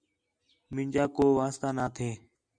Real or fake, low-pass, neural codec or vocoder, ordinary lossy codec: real; 9.9 kHz; none; Opus, 64 kbps